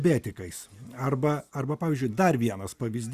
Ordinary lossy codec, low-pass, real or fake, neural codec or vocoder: Opus, 64 kbps; 14.4 kHz; real; none